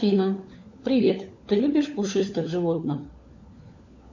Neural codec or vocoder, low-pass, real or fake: codec, 16 kHz, 4 kbps, FunCodec, trained on LibriTTS, 50 frames a second; 7.2 kHz; fake